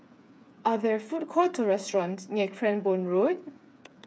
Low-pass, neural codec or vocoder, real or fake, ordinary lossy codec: none; codec, 16 kHz, 8 kbps, FreqCodec, smaller model; fake; none